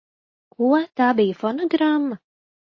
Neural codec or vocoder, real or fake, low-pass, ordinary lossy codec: none; real; 7.2 kHz; MP3, 32 kbps